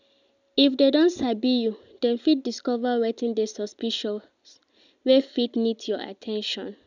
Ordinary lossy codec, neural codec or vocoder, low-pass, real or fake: none; none; 7.2 kHz; real